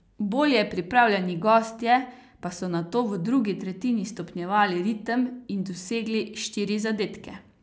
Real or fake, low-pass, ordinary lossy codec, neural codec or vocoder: real; none; none; none